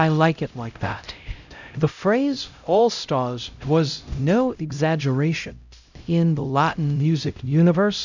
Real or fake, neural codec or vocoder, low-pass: fake; codec, 16 kHz, 0.5 kbps, X-Codec, HuBERT features, trained on LibriSpeech; 7.2 kHz